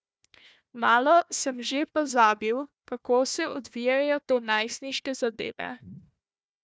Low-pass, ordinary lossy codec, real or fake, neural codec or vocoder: none; none; fake; codec, 16 kHz, 1 kbps, FunCodec, trained on Chinese and English, 50 frames a second